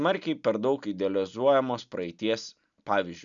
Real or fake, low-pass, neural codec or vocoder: real; 7.2 kHz; none